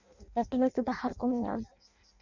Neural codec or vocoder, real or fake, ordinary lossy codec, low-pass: codec, 16 kHz in and 24 kHz out, 0.6 kbps, FireRedTTS-2 codec; fake; none; 7.2 kHz